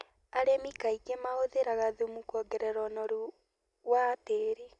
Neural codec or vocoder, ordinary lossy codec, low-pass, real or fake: none; none; 10.8 kHz; real